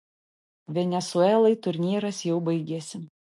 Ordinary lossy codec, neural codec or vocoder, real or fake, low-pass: MP3, 48 kbps; none; real; 10.8 kHz